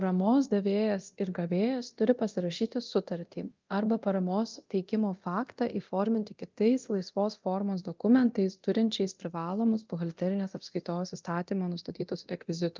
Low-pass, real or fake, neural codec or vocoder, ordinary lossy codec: 7.2 kHz; fake; codec, 24 kHz, 0.9 kbps, DualCodec; Opus, 24 kbps